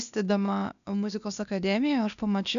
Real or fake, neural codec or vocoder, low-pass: fake; codec, 16 kHz, 0.8 kbps, ZipCodec; 7.2 kHz